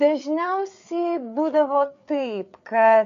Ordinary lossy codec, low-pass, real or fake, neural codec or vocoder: AAC, 64 kbps; 7.2 kHz; fake; codec, 16 kHz, 4 kbps, FreqCodec, larger model